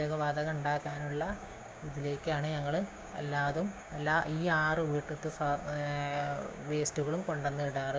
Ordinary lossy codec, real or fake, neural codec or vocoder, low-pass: none; fake; codec, 16 kHz, 6 kbps, DAC; none